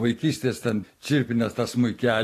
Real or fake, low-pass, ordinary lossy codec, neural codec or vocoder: fake; 14.4 kHz; AAC, 48 kbps; vocoder, 44.1 kHz, 128 mel bands every 512 samples, BigVGAN v2